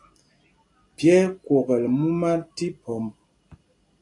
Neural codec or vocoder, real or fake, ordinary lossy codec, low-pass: none; real; AAC, 48 kbps; 10.8 kHz